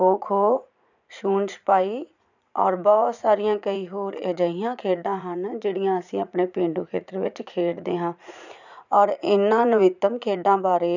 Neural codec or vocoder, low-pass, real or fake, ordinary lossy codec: vocoder, 44.1 kHz, 80 mel bands, Vocos; 7.2 kHz; fake; none